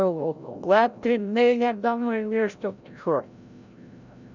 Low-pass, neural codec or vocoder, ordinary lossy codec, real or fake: 7.2 kHz; codec, 16 kHz, 0.5 kbps, FreqCodec, larger model; none; fake